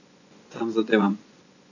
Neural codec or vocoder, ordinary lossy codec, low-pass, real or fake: vocoder, 24 kHz, 100 mel bands, Vocos; none; 7.2 kHz; fake